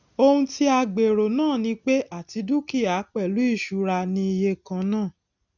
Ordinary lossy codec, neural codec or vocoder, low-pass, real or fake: none; none; 7.2 kHz; real